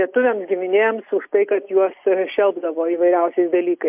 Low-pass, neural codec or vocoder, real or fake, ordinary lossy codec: 3.6 kHz; none; real; MP3, 32 kbps